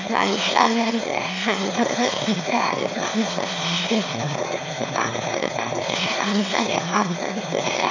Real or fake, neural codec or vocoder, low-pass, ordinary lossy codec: fake; autoencoder, 22.05 kHz, a latent of 192 numbers a frame, VITS, trained on one speaker; 7.2 kHz; none